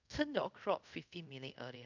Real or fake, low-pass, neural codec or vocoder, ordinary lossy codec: fake; 7.2 kHz; codec, 24 kHz, 0.5 kbps, DualCodec; none